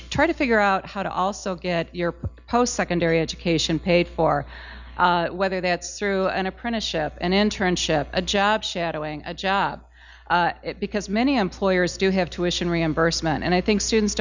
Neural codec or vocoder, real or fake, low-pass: none; real; 7.2 kHz